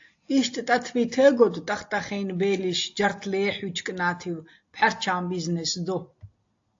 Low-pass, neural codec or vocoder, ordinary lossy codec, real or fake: 7.2 kHz; none; AAC, 48 kbps; real